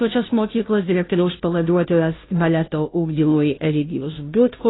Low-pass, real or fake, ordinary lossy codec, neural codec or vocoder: 7.2 kHz; fake; AAC, 16 kbps; codec, 16 kHz, 0.5 kbps, FunCodec, trained on Chinese and English, 25 frames a second